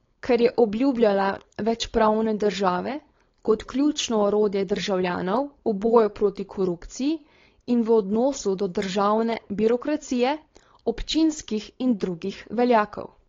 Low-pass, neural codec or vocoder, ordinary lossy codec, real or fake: 7.2 kHz; codec, 16 kHz, 4.8 kbps, FACodec; AAC, 32 kbps; fake